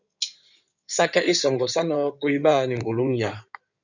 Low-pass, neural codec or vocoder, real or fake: 7.2 kHz; codec, 16 kHz in and 24 kHz out, 2.2 kbps, FireRedTTS-2 codec; fake